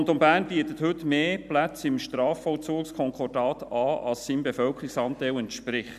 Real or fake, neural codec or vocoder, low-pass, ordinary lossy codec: real; none; 14.4 kHz; none